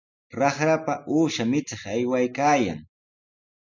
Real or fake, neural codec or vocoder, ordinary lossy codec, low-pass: real; none; MP3, 64 kbps; 7.2 kHz